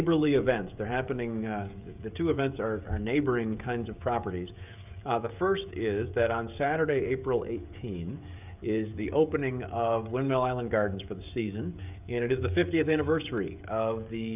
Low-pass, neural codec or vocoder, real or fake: 3.6 kHz; codec, 16 kHz, 16 kbps, FreqCodec, smaller model; fake